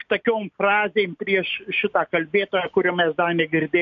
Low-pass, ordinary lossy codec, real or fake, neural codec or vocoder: 7.2 kHz; AAC, 64 kbps; real; none